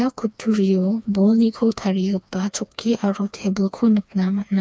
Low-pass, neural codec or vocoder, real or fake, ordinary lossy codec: none; codec, 16 kHz, 2 kbps, FreqCodec, smaller model; fake; none